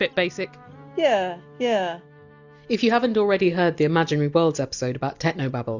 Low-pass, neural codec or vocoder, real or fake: 7.2 kHz; none; real